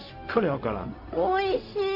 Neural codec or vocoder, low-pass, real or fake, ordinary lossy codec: codec, 16 kHz, 0.4 kbps, LongCat-Audio-Codec; 5.4 kHz; fake; MP3, 32 kbps